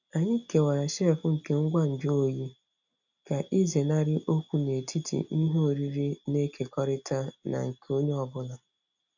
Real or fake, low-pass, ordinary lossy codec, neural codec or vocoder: real; 7.2 kHz; none; none